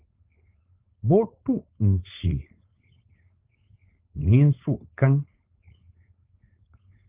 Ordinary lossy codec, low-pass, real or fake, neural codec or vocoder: Opus, 24 kbps; 3.6 kHz; fake; codec, 16 kHz, 4.8 kbps, FACodec